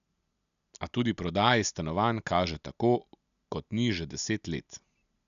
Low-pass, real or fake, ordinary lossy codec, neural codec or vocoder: 7.2 kHz; real; none; none